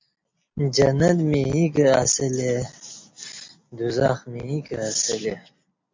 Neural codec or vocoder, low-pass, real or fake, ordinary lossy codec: none; 7.2 kHz; real; MP3, 48 kbps